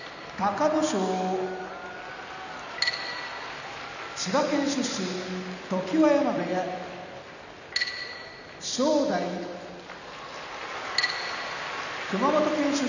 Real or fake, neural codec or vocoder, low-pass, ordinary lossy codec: real; none; 7.2 kHz; none